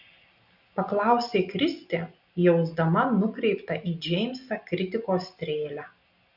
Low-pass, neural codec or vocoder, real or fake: 5.4 kHz; none; real